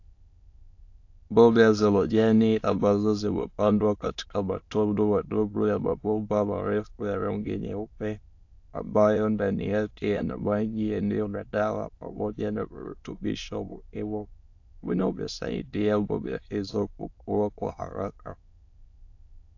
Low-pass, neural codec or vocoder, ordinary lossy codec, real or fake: 7.2 kHz; autoencoder, 22.05 kHz, a latent of 192 numbers a frame, VITS, trained on many speakers; AAC, 48 kbps; fake